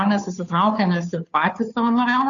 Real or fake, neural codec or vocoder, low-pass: fake; codec, 16 kHz, 2 kbps, FunCodec, trained on Chinese and English, 25 frames a second; 7.2 kHz